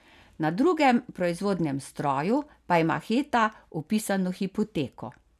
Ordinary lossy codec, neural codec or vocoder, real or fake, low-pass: none; none; real; 14.4 kHz